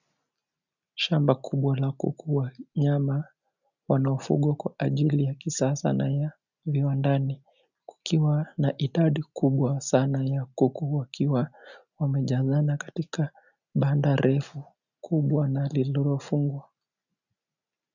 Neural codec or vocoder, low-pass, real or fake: none; 7.2 kHz; real